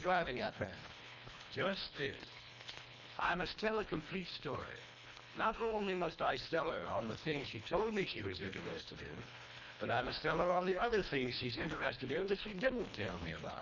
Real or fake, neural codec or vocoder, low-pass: fake; codec, 24 kHz, 1.5 kbps, HILCodec; 7.2 kHz